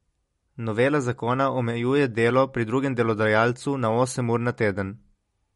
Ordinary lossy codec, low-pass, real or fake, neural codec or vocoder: MP3, 48 kbps; 19.8 kHz; real; none